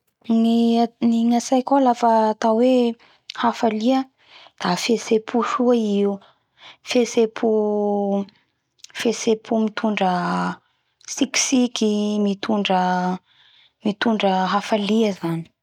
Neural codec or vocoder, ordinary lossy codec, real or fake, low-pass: none; none; real; 19.8 kHz